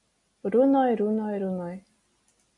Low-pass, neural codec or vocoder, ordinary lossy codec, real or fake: 10.8 kHz; none; MP3, 48 kbps; real